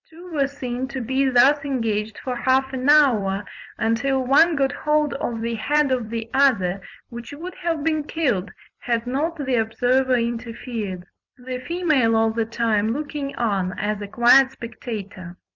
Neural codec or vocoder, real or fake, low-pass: vocoder, 44.1 kHz, 128 mel bands every 256 samples, BigVGAN v2; fake; 7.2 kHz